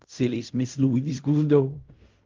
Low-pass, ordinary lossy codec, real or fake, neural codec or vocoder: 7.2 kHz; Opus, 32 kbps; fake; codec, 16 kHz in and 24 kHz out, 0.4 kbps, LongCat-Audio-Codec, fine tuned four codebook decoder